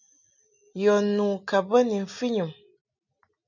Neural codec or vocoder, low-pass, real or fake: none; 7.2 kHz; real